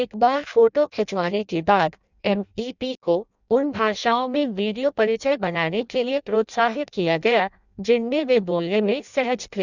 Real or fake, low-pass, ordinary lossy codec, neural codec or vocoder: fake; 7.2 kHz; none; codec, 16 kHz in and 24 kHz out, 0.6 kbps, FireRedTTS-2 codec